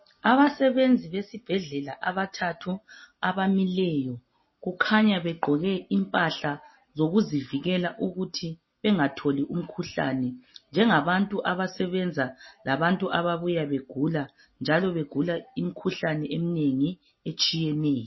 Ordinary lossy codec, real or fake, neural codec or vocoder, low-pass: MP3, 24 kbps; real; none; 7.2 kHz